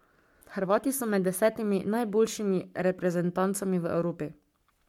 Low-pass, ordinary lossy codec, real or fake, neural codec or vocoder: 19.8 kHz; MP3, 96 kbps; fake; codec, 44.1 kHz, 7.8 kbps, Pupu-Codec